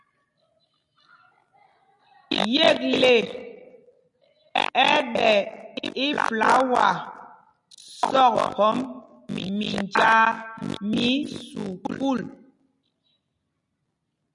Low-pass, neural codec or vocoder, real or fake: 10.8 kHz; none; real